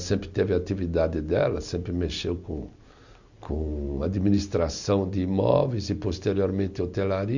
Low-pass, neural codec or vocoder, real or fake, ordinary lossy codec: 7.2 kHz; none; real; none